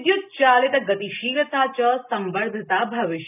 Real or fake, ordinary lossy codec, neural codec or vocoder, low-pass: real; none; none; 3.6 kHz